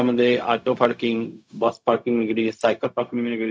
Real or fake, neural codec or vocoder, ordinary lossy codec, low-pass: fake; codec, 16 kHz, 0.4 kbps, LongCat-Audio-Codec; none; none